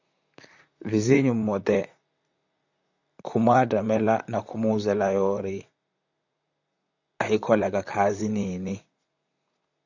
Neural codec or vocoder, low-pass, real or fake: vocoder, 44.1 kHz, 128 mel bands, Pupu-Vocoder; 7.2 kHz; fake